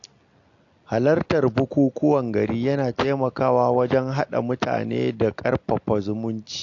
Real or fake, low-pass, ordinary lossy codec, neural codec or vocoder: real; 7.2 kHz; AAC, 48 kbps; none